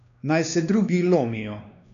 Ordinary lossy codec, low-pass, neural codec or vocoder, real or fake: none; 7.2 kHz; codec, 16 kHz, 2 kbps, X-Codec, WavLM features, trained on Multilingual LibriSpeech; fake